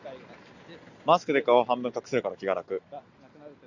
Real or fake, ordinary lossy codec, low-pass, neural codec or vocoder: real; Opus, 64 kbps; 7.2 kHz; none